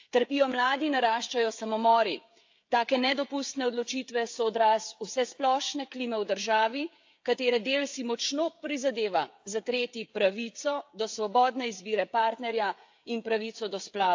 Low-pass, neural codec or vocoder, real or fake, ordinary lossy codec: 7.2 kHz; codec, 16 kHz, 16 kbps, FreqCodec, smaller model; fake; AAC, 48 kbps